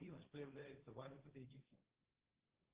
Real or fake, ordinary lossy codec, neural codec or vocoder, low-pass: fake; Opus, 16 kbps; codec, 16 kHz, 1.1 kbps, Voila-Tokenizer; 3.6 kHz